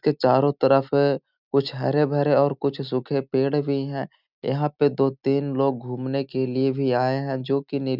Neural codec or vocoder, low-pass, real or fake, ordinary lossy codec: none; 5.4 kHz; real; none